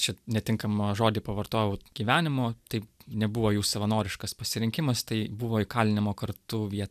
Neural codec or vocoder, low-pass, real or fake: none; 14.4 kHz; real